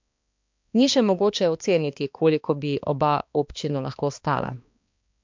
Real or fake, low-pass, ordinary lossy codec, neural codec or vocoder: fake; 7.2 kHz; MP3, 64 kbps; codec, 16 kHz, 2 kbps, X-Codec, HuBERT features, trained on balanced general audio